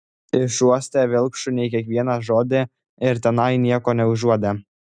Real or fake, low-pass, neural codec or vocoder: real; 9.9 kHz; none